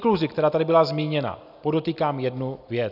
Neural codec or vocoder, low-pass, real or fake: none; 5.4 kHz; real